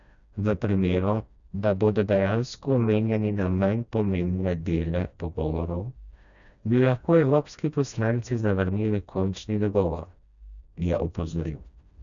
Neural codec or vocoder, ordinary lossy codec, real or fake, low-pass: codec, 16 kHz, 1 kbps, FreqCodec, smaller model; none; fake; 7.2 kHz